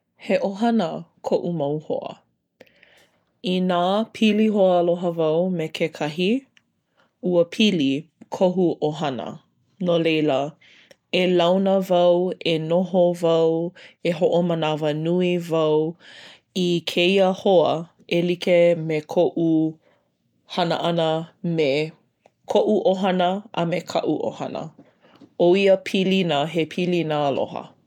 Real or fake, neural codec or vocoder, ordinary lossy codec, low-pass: real; none; none; 19.8 kHz